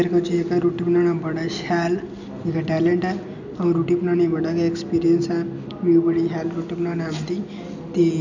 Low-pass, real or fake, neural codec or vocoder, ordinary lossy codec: 7.2 kHz; real; none; none